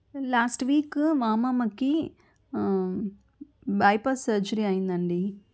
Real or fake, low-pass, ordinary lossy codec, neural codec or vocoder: real; none; none; none